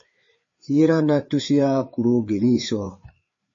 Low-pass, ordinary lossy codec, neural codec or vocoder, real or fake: 7.2 kHz; MP3, 32 kbps; codec, 16 kHz, 4 kbps, FreqCodec, larger model; fake